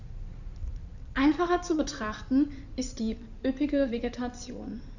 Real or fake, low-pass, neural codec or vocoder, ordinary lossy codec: fake; 7.2 kHz; codec, 16 kHz in and 24 kHz out, 2.2 kbps, FireRedTTS-2 codec; none